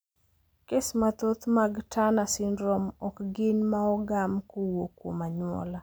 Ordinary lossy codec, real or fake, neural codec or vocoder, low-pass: none; real; none; none